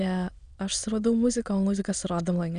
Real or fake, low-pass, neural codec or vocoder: fake; 9.9 kHz; autoencoder, 22.05 kHz, a latent of 192 numbers a frame, VITS, trained on many speakers